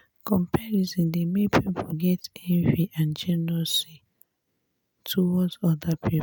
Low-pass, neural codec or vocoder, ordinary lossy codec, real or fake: none; none; none; real